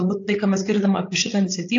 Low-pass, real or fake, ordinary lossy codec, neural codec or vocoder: 7.2 kHz; fake; AAC, 48 kbps; codec, 16 kHz, 8 kbps, FreqCodec, larger model